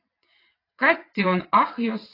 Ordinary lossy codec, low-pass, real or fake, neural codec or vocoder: AAC, 24 kbps; 5.4 kHz; fake; vocoder, 22.05 kHz, 80 mel bands, WaveNeXt